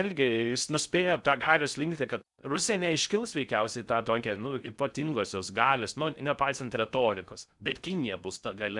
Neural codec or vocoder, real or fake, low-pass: codec, 16 kHz in and 24 kHz out, 0.6 kbps, FocalCodec, streaming, 2048 codes; fake; 10.8 kHz